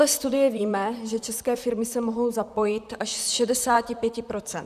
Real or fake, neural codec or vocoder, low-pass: fake; vocoder, 44.1 kHz, 128 mel bands, Pupu-Vocoder; 14.4 kHz